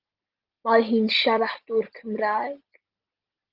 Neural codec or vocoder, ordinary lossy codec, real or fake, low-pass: none; Opus, 24 kbps; real; 5.4 kHz